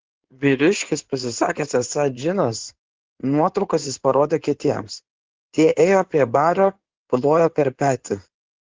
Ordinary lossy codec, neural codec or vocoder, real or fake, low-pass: Opus, 16 kbps; codec, 16 kHz in and 24 kHz out, 2.2 kbps, FireRedTTS-2 codec; fake; 7.2 kHz